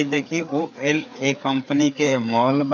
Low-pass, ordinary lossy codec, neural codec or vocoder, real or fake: 7.2 kHz; none; codec, 16 kHz, 4 kbps, FreqCodec, larger model; fake